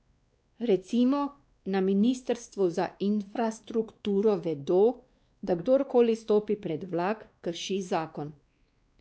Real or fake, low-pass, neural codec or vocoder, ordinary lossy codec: fake; none; codec, 16 kHz, 2 kbps, X-Codec, WavLM features, trained on Multilingual LibriSpeech; none